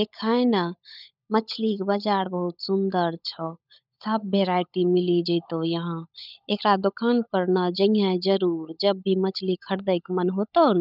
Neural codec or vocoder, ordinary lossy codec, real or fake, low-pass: codec, 16 kHz, 16 kbps, FunCodec, trained on LibriTTS, 50 frames a second; none; fake; 5.4 kHz